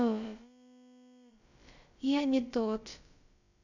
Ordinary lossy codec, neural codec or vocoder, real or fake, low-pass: none; codec, 16 kHz, about 1 kbps, DyCAST, with the encoder's durations; fake; 7.2 kHz